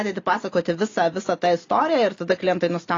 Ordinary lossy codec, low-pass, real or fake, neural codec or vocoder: AAC, 32 kbps; 7.2 kHz; real; none